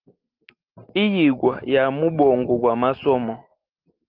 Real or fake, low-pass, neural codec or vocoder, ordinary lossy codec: real; 5.4 kHz; none; Opus, 32 kbps